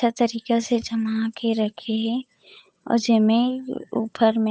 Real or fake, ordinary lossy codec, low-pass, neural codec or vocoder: fake; none; none; codec, 16 kHz, 8 kbps, FunCodec, trained on Chinese and English, 25 frames a second